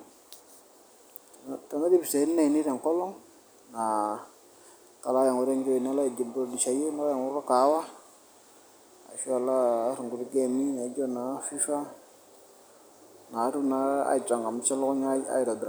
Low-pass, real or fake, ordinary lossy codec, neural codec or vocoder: none; real; none; none